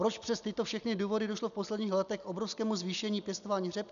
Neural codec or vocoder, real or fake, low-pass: none; real; 7.2 kHz